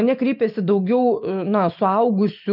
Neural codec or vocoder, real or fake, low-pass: none; real; 5.4 kHz